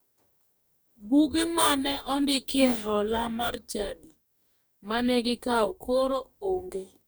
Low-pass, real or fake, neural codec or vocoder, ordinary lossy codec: none; fake; codec, 44.1 kHz, 2.6 kbps, DAC; none